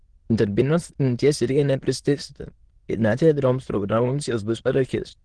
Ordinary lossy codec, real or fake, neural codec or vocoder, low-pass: Opus, 16 kbps; fake; autoencoder, 22.05 kHz, a latent of 192 numbers a frame, VITS, trained on many speakers; 9.9 kHz